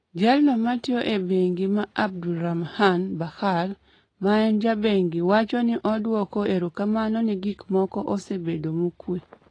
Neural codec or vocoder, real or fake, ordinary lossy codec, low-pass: none; real; AAC, 32 kbps; 9.9 kHz